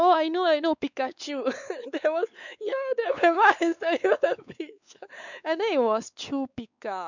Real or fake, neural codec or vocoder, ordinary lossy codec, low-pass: fake; codec, 16 kHz, 4 kbps, X-Codec, WavLM features, trained on Multilingual LibriSpeech; none; 7.2 kHz